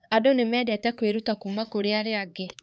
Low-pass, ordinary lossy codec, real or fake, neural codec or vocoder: none; none; fake; codec, 16 kHz, 4 kbps, X-Codec, HuBERT features, trained on LibriSpeech